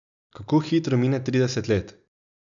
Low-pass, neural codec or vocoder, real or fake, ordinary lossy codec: 7.2 kHz; none; real; none